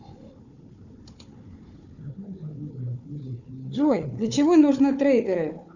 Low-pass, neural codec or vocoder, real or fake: 7.2 kHz; codec, 16 kHz, 4 kbps, FunCodec, trained on Chinese and English, 50 frames a second; fake